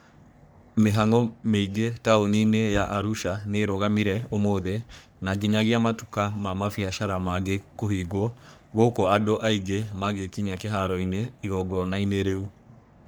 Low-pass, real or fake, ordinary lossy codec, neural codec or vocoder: none; fake; none; codec, 44.1 kHz, 3.4 kbps, Pupu-Codec